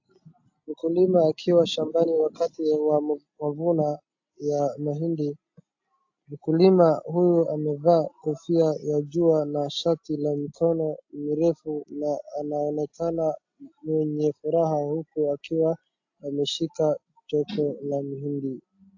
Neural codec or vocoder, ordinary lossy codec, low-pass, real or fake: none; AAC, 48 kbps; 7.2 kHz; real